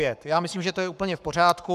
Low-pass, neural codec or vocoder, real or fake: 14.4 kHz; codec, 44.1 kHz, 7.8 kbps, Pupu-Codec; fake